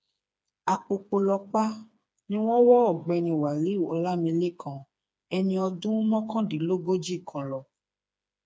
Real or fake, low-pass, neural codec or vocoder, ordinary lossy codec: fake; none; codec, 16 kHz, 4 kbps, FreqCodec, smaller model; none